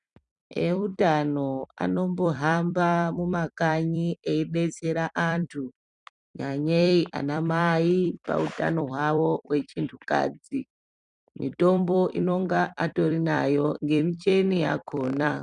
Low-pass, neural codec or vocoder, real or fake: 10.8 kHz; vocoder, 44.1 kHz, 128 mel bands every 256 samples, BigVGAN v2; fake